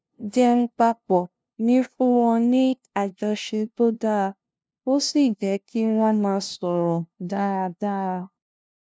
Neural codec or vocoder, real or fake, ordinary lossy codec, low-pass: codec, 16 kHz, 0.5 kbps, FunCodec, trained on LibriTTS, 25 frames a second; fake; none; none